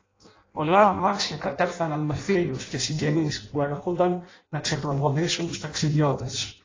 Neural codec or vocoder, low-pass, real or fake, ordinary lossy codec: codec, 16 kHz in and 24 kHz out, 0.6 kbps, FireRedTTS-2 codec; 7.2 kHz; fake; AAC, 32 kbps